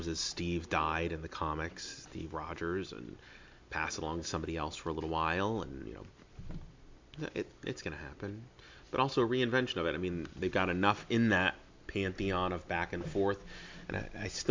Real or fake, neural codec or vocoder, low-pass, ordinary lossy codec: real; none; 7.2 kHz; MP3, 64 kbps